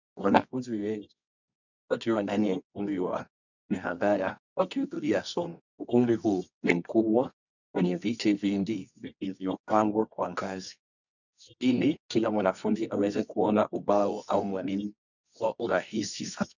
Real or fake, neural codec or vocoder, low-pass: fake; codec, 24 kHz, 0.9 kbps, WavTokenizer, medium music audio release; 7.2 kHz